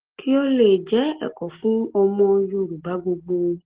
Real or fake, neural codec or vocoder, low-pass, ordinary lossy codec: real; none; 3.6 kHz; Opus, 16 kbps